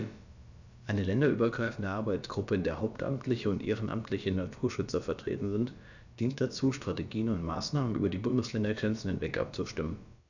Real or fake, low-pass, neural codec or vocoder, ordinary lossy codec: fake; 7.2 kHz; codec, 16 kHz, about 1 kbps, DyCAST, with the encoder's durations; none